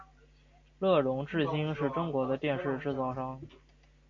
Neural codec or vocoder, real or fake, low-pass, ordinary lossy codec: none; real; 7.2 kHz; MP3, 48 kbps